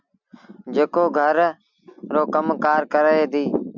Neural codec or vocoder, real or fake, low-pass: none; real; 7.2 kHz